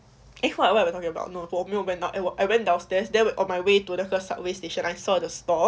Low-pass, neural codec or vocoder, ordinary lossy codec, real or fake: none; none; none; real